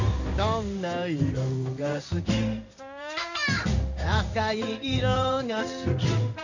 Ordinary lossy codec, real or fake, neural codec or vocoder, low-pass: none; fake; codec, 16 kHz in and 24 kHz out, 1 kbps, XY-Tokenizer; 7.2 kHz